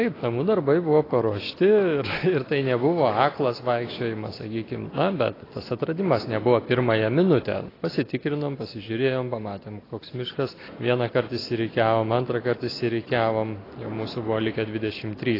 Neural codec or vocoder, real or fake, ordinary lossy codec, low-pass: none; real; AAC, 24 kbps; 5.4 kHz